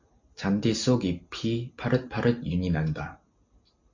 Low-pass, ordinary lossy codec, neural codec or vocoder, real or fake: 7.2 kHz; MP3, 48 kbps; none; real